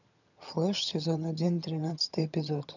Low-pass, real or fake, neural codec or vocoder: 7.2 kHz; fake; vocoder, 22.05 kHz, 80 mel bands, HiFi-GAN